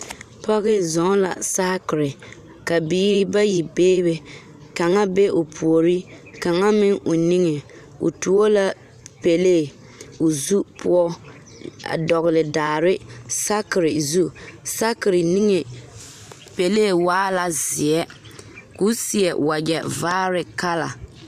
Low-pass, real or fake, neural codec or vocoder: 14.4 kHz; fake; vocoder, 44.1 kHz, 128 mel bands every 512 samples, BigVGAN v2